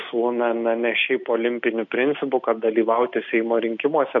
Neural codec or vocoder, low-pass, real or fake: none; 7.2 kHz; real